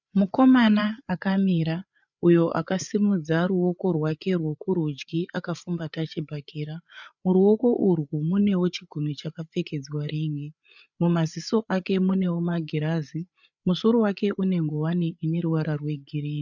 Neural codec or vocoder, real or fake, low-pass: codec, 16 kHz, 8 kbps, FreqCodec, larger model; fake; 7.2 kHz